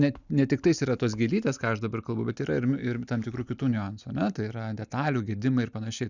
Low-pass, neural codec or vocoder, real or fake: 7.2 kHz; none; real